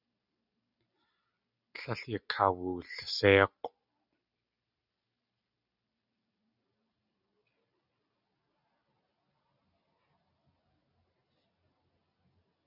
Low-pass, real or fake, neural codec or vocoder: 5.4 kHz; real; none